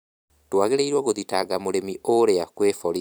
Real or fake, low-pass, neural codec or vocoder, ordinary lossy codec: fake; none; vocoder, 44.1 kHz, 128 mel bands every 256 samples, BigVGAN v2; none